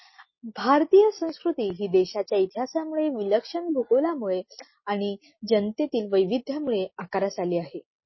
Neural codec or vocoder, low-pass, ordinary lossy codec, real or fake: none; 7.2 kHz; MP3, 24 kbps; real